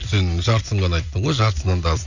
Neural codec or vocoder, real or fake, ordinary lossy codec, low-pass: none; real; none; 7.2 kHz